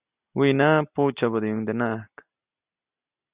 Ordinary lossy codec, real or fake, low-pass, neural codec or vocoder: Opus, 64 kbps; real; 3.6 kHz; none